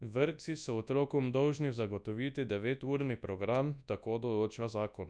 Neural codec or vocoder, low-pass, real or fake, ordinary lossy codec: codec, 24 kHz, 0.9 kbps, WavTokenizer, large speech release; 9.9 kHz; fake; none